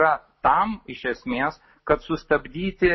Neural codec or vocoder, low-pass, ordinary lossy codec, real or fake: none; 7.2 kHz; MP3, 24 kbps; real